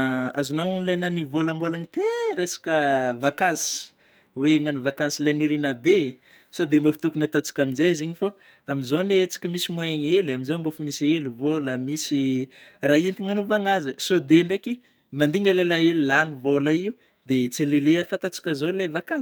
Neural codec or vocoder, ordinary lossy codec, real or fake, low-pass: codec, 44.1 kHz, 2.6 kbps, SNAC; none; fake; none